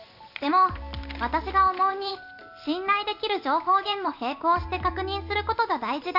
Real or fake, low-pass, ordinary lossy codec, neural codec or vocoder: real; 5.4 kHz; none; none